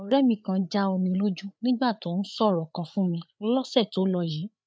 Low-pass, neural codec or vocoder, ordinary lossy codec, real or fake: none; codec, 16 kHz, 8 kbps, FreqCodec, larger model; none; fake